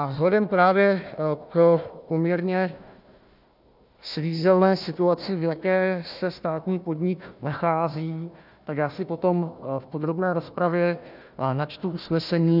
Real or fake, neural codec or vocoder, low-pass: fake; codec, 16 kHz, 1 kbps, FunCodec, trained on Chinese and English, 50 frames a second; 5.4 kHz